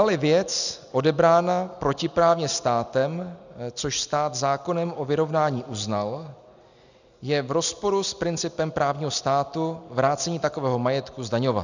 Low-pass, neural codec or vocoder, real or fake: 7.2 kHz; none; real